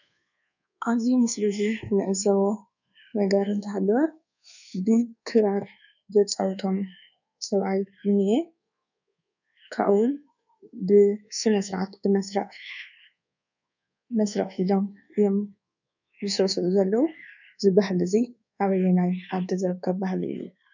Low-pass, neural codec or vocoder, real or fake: 7.2 kHz; codec, 24 kHz, 1.2 kbps, DualCodec; fake